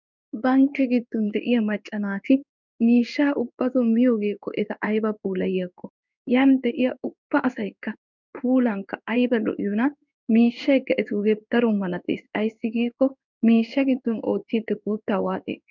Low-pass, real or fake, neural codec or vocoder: 7.2 kHz; fake; codec, 16 kHz in and 24 kHz out, 1 kbps, XY-Tokenizer